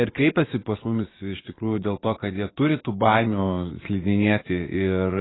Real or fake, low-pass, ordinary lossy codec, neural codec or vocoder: fake; 7.2 kHz; AAC, 16 kbps; vocoder, 24 kHz, 100 mel bands, Vocos